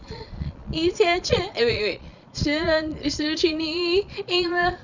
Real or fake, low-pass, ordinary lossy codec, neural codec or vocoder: fake; 7.2 kHz; none; vocoder, 22.05 kHz, 80 mel bands, Vocos